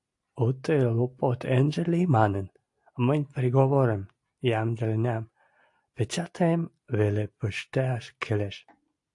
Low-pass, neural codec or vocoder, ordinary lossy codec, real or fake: 10.8 kHz; none; AAC, 64 kbps; real